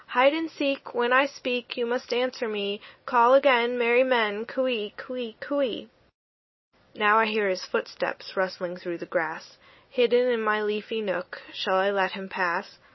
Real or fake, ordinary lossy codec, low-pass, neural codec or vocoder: real; MP3, 24 kbps; 7.2 kHz; none